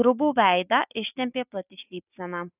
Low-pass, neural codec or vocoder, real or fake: 3.6 kHz; none; real